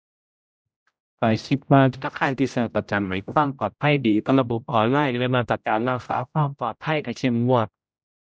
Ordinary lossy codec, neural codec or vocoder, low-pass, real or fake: none; codec, 16 kHz, 0.5 kbps, X-Codec, HuBERT features, trained on general audio; none; fake